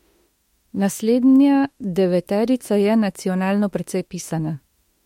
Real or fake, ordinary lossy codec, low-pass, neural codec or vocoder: fake; MP3, 64 kbps; 19.8 kHz; autoencoder, 48 kHz, 32 numbers a frame, DAC-VAE, trained on Japanese speech